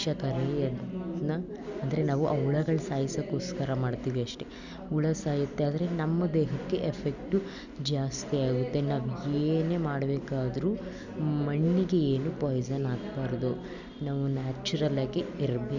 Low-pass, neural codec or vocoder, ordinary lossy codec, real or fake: 7.2 kHz; none; none; real